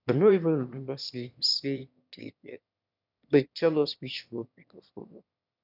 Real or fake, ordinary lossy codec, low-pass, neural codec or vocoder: fake; none; 5.4 kHz; autoencoder, 22.05 kHz, a latent of 192 numbers a frame, VITS, trained on one speaker